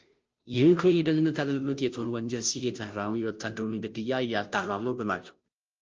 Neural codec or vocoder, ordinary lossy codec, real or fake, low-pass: codec, 16 kHz, 0.5 kbps, FunCodec, trained on Chinese and English, 25 frames a second; Opus, 24 kbps; fake; 7.2 kHz